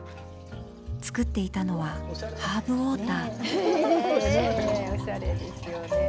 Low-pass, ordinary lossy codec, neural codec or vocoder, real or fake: none; none; none; real